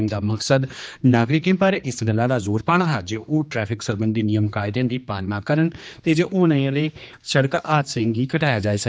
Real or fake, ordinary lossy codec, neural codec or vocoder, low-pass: fake; none; codec, 16 kHz, 2 kbps, X-Codec, HuBERT features, trained on general audio; none